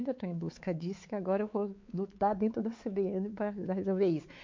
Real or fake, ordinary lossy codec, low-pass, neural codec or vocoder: fake; MP3, 48 kbps; 7.2 kHz; codec, 16 kHz, 4 kbps, X-Codec, WavLM features, trained on Multilingual LibriSpeech